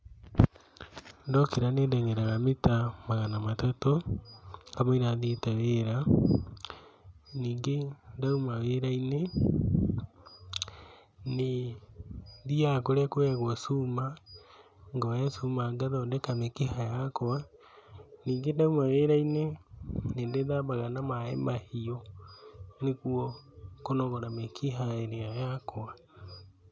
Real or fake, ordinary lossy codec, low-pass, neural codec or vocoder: real; none; none; none